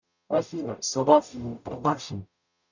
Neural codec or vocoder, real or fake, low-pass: codec, 44.1 kHz, 0.9 kbps, DAC; fake; 7.2 kHz